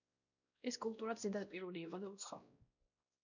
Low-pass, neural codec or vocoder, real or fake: 7.2 kHz; codec, 16 kHz, 1 kbps, X-Codec, WavLM features, trained on Multilingual LibriSpeech; fake